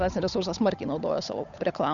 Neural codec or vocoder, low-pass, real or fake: codec, 16 kHz, 8 kbps, FunCodec, trained on Chinese and English, 25 frames a second; 7.2 kHz; fake